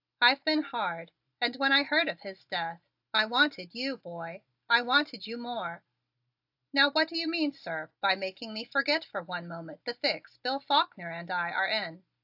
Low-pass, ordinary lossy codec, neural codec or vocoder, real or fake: 5.4 kHz; AAC, 48 kbps; none; real